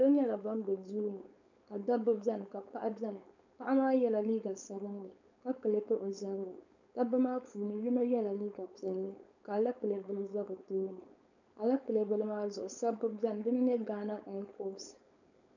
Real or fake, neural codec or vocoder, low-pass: fake; codec, 16 kHz, 4.8 kbps, FACodec; 7.2 kHz